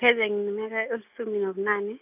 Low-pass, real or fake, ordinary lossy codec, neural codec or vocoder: 3.6 kHz; real; AAC, 32 kbps; none